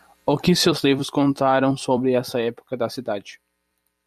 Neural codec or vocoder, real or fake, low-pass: vocoder, 44.1 kHz, 128 mel bands every 256 samples, BigVGAN v2; fake; 14.4 kHz